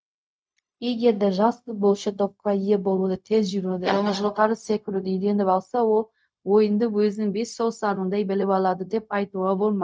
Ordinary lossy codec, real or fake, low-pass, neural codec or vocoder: none; fake; none; codec, 16 kHz, 0.4 kbps, LongCat-Audio-Codec